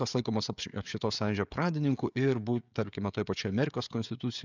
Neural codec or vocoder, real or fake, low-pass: codec, 16 kHz, 16 kbps, FreqCodec, smaller model; fake; 7.2 kHz